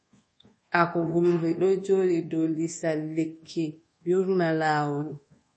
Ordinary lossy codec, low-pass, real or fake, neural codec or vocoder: MP3, 32 kbps; 10.8 kHz; fake; codec, 24 kHz, 1.2 kbps, DualCodec